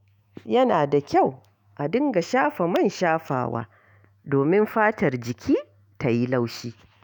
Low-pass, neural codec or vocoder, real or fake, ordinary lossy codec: 19.8 kHz; autoencoder, 48 kHz, 128 numbers a frame, DAC-VAE, trained on Japanese speech; fake; none